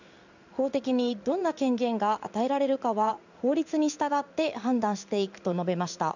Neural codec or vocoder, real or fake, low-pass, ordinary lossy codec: codec, 16 kHz in and 24 kHz out, 1 kbps, XY-Tokenizer; fake; 7.2 kHz; none